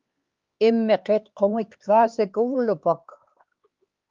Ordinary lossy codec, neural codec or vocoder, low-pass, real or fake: Opus, 32 kbps; codec, 16 kHz, 4 kbps, X-Codec, HuBERT features, trained on LibriSpeech; 7.2 kHz; fake